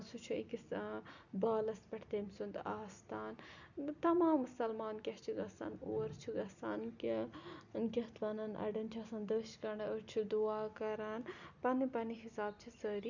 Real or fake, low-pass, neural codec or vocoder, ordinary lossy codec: real; 7.2 kHz; none; none